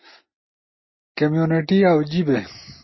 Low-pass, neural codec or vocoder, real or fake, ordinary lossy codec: 7.2 kHz; none; real; MP3, 24 kbps